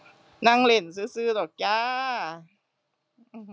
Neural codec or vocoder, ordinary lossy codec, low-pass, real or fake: none; none; none; real